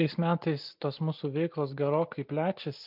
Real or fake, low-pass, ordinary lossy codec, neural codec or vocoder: real; 5.4 kHz; MP3, 48 kbps; none